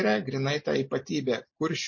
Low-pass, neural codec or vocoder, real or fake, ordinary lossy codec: 7.2 kHz; none; real; MP3, 32 kbps